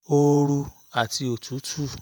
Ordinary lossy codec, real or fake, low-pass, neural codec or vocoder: none; fake; none; vocoder, 48 kHz, 128 mel bands, Vocos